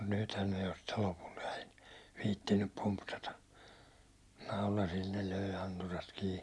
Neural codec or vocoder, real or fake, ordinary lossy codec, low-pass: none; real; none; none